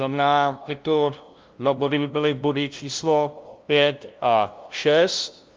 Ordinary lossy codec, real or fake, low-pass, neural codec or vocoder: Opus, 32 kbps; fake; 7.2 kHz; codec, 16 kHz, 0.5 kbps, FunCodec, trained on LibriTTS, 25 frames a second